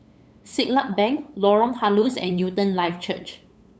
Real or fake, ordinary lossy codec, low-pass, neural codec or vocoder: fake; none; none; codec, 16 kHz, 8 kbps, FunCodec, trained on LibriTTS, 25 frames a second